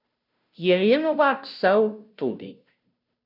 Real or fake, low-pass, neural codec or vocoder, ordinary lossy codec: fake; 5.4 kHz; codec, 16 kHz, 0.5 kbps, FunCodec, trained on Chinese and English, 25 frames a second; none